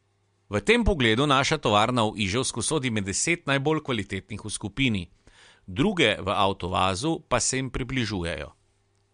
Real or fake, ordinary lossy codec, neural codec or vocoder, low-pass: real; MP3, 64 kbps; none; 9.9 kHz